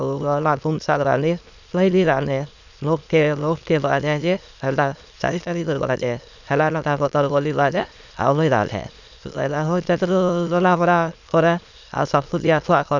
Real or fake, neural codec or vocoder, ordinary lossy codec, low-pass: fake; autoencoder, 22.05 kHz, a latent of 192 numbers a frame, VITS, trained on many speakers; none; 7.2 kHz